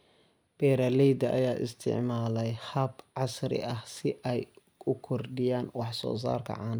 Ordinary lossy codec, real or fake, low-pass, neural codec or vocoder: none; real; none; none